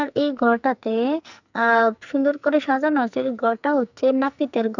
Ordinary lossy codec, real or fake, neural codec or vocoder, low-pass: none; fake; codec, 44.1 kHz, 2.6 kbps, SNAC; 7.2 kHz